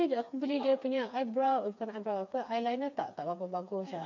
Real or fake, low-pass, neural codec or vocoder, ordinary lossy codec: fake; 7.2 kHz; codec, 16 kHz, 4 kbps, FreqCodec, smaller model; MP3, 48 kbps